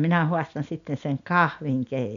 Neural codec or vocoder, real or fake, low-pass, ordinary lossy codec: none; real; 7.2 kHz; none